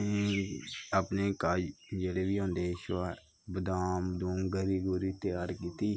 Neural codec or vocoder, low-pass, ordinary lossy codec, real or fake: none; none; none; real